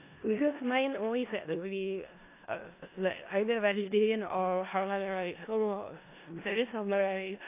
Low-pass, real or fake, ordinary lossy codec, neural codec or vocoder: 3.6 kHz; fake; AAC, 24 kbps; codec, 16 kHz in and 24 kHz out, 0.4 kbps, LongCat-Audio-Codec, four codebook decoder